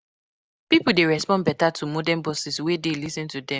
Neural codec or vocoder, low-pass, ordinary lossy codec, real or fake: none; none; none; real